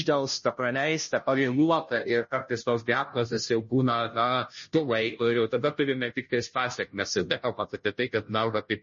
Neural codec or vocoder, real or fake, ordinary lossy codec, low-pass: codec, 16 kHz, 0.5 kbps, FunCodec, trained on Chinese and English, 25 frames a second; fake; MP3, 32 kbps; 7.2 kHz